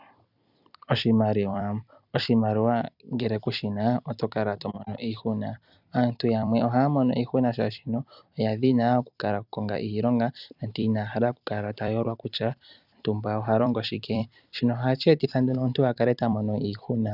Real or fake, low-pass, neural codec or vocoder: fake; 5.4 kHz; vocoder, 44.1 kHz, 128 mel bands every 256 samples, BigVGAN v2